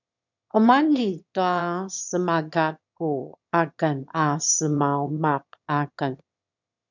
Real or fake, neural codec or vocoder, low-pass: fake; autoencoder, 22.05 kHz, a latent of 192 numbers a frame, VITS, trained on one speaker; 7.2 kHz